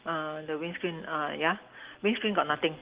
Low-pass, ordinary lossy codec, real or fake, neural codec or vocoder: 3.6 kHz; Opus, 16 kbps; real; none